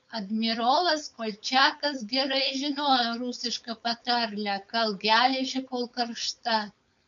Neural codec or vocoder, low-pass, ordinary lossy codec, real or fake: codec, 16 kHz, 4.8 kbps, FACodec; 7.2 kHz; MP3, 64 kbps; fake